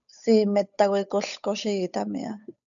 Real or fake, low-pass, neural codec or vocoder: fake; 7.2 kHz; codec, 16 kHz, 8 kbps, FunCodec, trained on Chinese and English, 25 frames a second